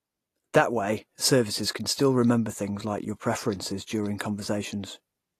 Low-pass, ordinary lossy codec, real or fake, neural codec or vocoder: 14.4 kHz; AAC, 48 kbps; real; none